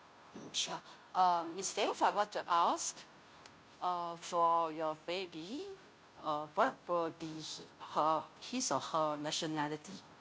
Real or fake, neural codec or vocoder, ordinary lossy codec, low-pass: fake; codec, 16 kHz, 0.5 kbps, FunCodec, trained on Chinese and English, 25 frames a second; none; none